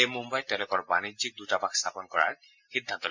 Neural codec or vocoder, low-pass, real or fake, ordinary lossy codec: none; 7.2 kHz; real; MP3, 64 kbps